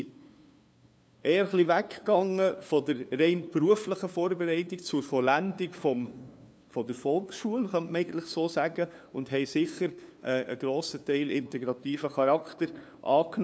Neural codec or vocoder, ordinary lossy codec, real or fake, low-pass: codec, 16 kHz, 2 kbps, FunCodec, trained on LibriTTS, 25 frames a second; none; fake; none